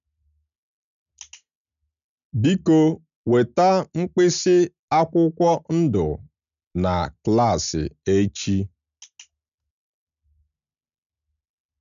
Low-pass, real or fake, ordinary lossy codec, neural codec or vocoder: 7.2 kHz; real; none; none